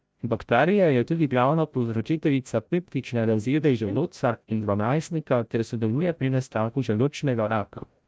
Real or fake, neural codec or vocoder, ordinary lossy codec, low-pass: fake; codec, 16 kHz, 0.5 kbps, FreqCodec, larger model; none; none